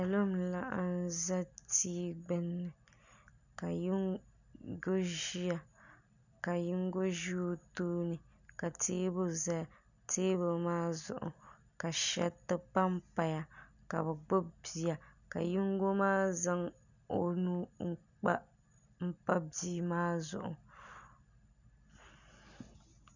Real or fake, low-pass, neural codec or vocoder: real; 7.2 kHz; none